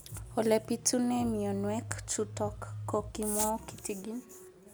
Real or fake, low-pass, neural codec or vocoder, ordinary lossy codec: real; none; none; none